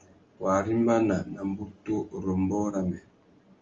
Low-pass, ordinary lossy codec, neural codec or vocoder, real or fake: 7.2 kHz; Opus, 32 kbps; none; real